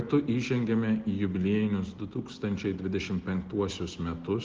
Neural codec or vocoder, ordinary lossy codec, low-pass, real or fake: none; Opus, 16 kbps; 7.2 kHz; real